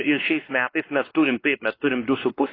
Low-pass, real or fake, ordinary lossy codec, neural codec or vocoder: 5.4 kHz; fake; AAC, 24 kbps; codec, 16 kHz, 2 kbps, X-Codec, WavLM features, trained on Multilingual LibriSpeech